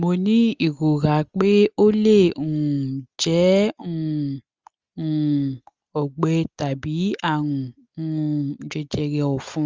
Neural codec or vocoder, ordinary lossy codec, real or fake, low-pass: none; Opus, 32 kbps; real; 7.2 kHz